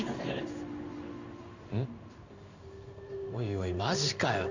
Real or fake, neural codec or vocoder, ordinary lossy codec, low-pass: fake; codec, 16 kHz in and 24 kHz out, 1 kbps, XY-Tokenizer; Opus, 64 kbps; 7.2 kHz